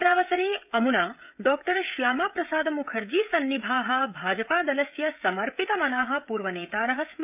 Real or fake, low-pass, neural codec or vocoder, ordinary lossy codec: fake; 3.6 kHz; codec, 16 kHz, 16 kbps, FreqCodec, smaller model; none